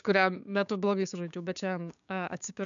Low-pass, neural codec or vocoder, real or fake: 7.2 kHz; codec, 16 kHz, 6 kbps, DAC; fake